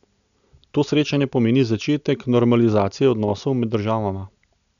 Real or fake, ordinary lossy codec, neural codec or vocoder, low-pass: real; none; none; 7.2 kHz